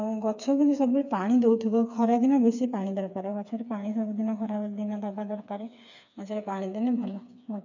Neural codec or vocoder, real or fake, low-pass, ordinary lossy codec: codec, 16 kHz, 4 kbps, FreqCodec, smaller model; fake; 7.2 kHz; none